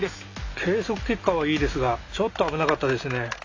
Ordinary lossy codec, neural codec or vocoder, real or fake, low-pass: AAC, 48 kbps; none; real; 7.2 kHz